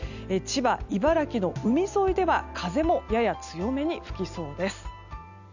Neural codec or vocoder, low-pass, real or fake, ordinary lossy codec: none; 7.2 kHz; real; none